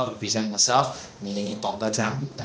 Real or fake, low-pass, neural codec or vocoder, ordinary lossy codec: fake; none; codec, 16 kHz, 1 kbps, X-Codec, HuBERT features, trained on balanced general audio; none